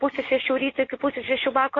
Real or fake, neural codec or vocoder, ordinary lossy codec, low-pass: real; none; AAC, 32 kbps; 7.2 kHz